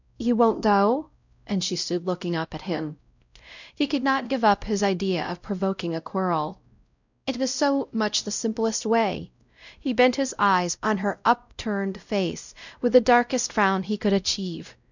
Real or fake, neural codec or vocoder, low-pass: fake; codec, 16 kHz, 0.5 kbps, X-Codec, WavLM features, trained on Multilingual LibriSpeech; 7.2 kHz